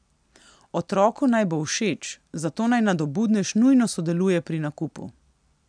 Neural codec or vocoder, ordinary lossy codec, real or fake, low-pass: none; none; real; 9.9 kHz